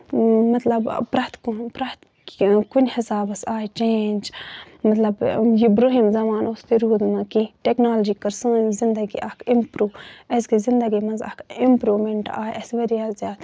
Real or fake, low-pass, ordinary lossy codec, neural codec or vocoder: real; none; none; none